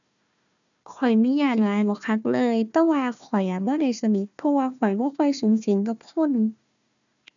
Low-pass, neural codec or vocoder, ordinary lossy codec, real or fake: 7.2 kHz; codec, 16 kHz, 1 kbps, FunCodec, trained on Chinese and English, 50 frames a second; none; fake